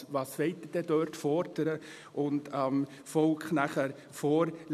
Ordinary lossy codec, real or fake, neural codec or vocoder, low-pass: none; real; none; 14.4 kHz